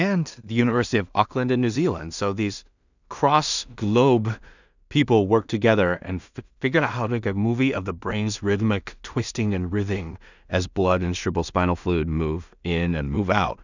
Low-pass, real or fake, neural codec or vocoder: 7.2 kHz; fake; codec, 16 kHz in and 24 kHz out, 0.4 kbps, LongCat-Audio-Codec, two codebook decoder